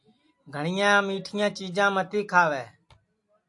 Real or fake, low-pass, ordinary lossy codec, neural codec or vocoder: real; 10.8 kHz; AAC, 64 kbps; none